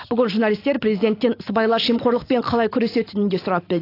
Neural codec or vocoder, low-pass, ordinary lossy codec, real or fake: none; 5.4 kHz; AAC, 32 kbps; real